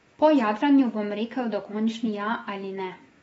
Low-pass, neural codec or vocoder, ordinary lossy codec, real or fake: 19.8 kHz; autoencoder, 48 kHz, 128 numbers a frame, DAC-VAE, trained on Japanese speech; AAC, 24 kbps; fake